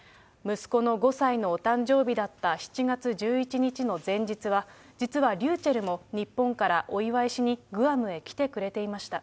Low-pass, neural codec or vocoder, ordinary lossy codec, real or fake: none; none; none; real